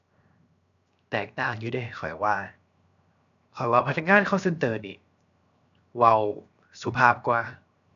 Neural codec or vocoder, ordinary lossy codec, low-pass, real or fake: codec, 16 kHz, 0.7 kbps, FocalCodec; none; 7.2 kHz; fake